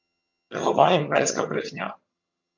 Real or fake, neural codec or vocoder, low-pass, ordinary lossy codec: fake; vocoder, 22.05 kHz, 80 mel bands, HiFi-GAN; 7.2 kHz; MP3, 48 kbps